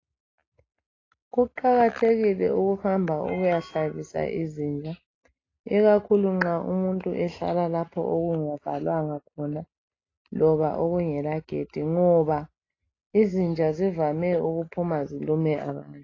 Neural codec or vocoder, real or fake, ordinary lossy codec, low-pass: none; real; AAC, 32 kbps; 7.2 kHz